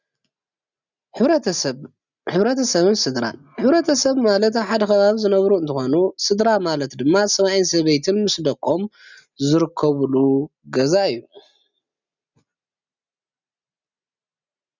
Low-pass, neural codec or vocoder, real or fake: 7.2 kHz; none; real